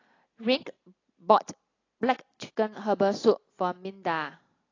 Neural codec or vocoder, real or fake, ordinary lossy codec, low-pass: none; real; AAC, 32 kbps; 7.2 kHz